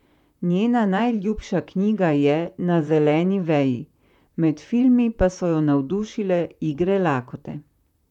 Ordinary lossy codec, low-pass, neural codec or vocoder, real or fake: none; 19.8 kHz; vocoder, 44.1 kHz, 128 mel bands, Pupu-Vocoder; fake